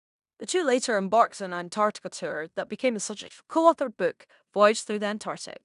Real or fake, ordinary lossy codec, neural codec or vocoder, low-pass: fake; none; codec, 16 kHz in and 24 kHz out, 0.9 kbps, LongCat-Audio-Codec, fine tuned four codebook decoder; 10.8 kHz